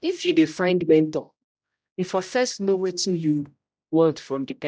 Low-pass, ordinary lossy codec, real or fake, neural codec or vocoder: none; none; fake; codec, 16 kHz, 0.5 kbps, X-Codec, HuBERT features, trained on general audio